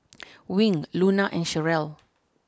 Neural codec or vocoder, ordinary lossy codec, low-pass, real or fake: none; none; none; real